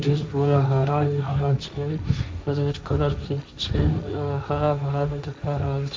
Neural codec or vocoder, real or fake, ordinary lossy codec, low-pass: codec, 16 kHz, 1.1 kbps, Voila-Tokenizer; fake; none; none